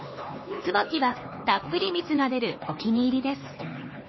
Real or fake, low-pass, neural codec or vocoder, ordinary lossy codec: fake; 7.2 kHz; codec, 16 kHz, 4 kbps, X-Codec, HuBERT features, trained on LibriSpeech; MP3, 24 kbps